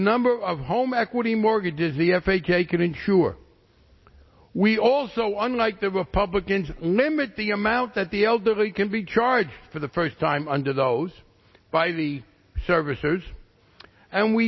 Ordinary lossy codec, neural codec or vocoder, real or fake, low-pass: MP3, 24 kbps; none; real; 7.2 kHz